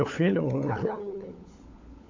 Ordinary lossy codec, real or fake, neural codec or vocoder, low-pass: AAC, 48 kbps; fake; codec, 16 kHz, 16 kbps, FunCodec, trained on LibriTTS, 50 frames a second; 7.2 kHz